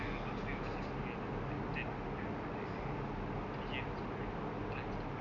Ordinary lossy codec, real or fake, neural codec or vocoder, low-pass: none; real; none; 7.2 kHz